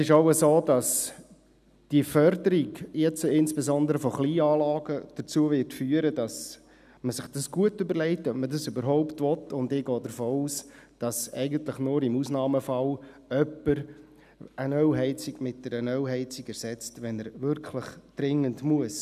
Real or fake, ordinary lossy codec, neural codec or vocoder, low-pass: real; none; none; 14.4 kHz